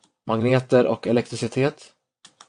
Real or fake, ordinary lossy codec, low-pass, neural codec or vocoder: fake; MP3, 64 kbps; 9.9 kHz; vocoder, 22.05 kHz, 80 mel bands, WaveNeXt